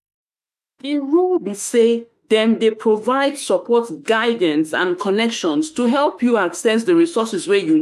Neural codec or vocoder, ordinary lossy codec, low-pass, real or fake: autoencoder, 48 kHz, 32 numbers a frame, DAC-VAE, trained on Japanese speech; none; 14.4 kHz; fake